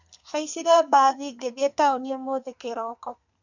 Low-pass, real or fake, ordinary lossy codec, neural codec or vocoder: 7.2 kHz; fake; none; codec, 32 kHz, 1.9 kbps, SNAC